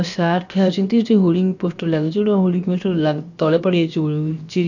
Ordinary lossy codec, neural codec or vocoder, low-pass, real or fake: none; codec, 16 kHz, about 1 kbps, DyCAST, with the encoder's durations; 7.2 kHz; fake